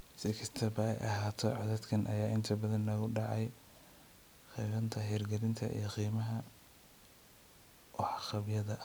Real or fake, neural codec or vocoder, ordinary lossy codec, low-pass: real; none; none; none